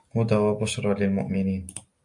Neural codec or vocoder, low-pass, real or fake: none; 10.8 kHz; real